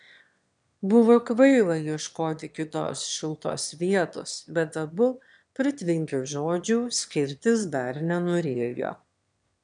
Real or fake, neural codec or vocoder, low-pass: fake; autoencoder, 22.05 kHz, a latent of 192 numbers a frame, VITS, trained on one speaker; 9.9 kHz